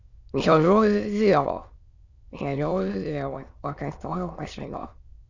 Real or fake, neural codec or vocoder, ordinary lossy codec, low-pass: fake; autoencoder, 22.05 kHz, a latent of 192 numbers a frame, VITS, trained on many speakers; Opus, 64 kbps; 7.2 kHz